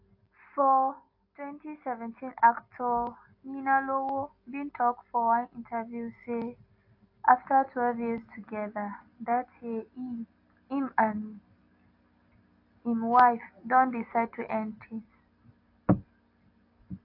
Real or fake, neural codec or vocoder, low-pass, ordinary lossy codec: real; none; 5.4 kHz; AAC, 32 kbps